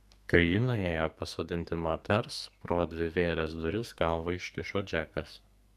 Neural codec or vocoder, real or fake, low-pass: codec, 44.1 kHz, 2.6 kbps, SNAC; fake; 14.4 kHz